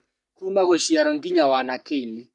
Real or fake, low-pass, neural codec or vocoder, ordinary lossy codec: fake; 10.8 kHz; codec, 44.1 kHz, 3.4 kbps, Pupu-Codec; none